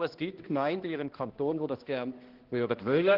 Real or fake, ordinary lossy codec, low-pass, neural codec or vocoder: fake; Opus, 16 kbps; 5.4 kHz; codec, 16 kHz, 1 kbps, X-Codec, HuBERT features, trained on balanced general audio